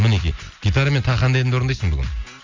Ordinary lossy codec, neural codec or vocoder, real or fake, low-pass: MP3, 64 kbps; none; real; 7.2 kHz